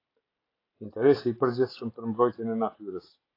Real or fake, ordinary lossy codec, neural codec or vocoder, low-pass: real; AAC, 24 kbps; none; 5.4 kHz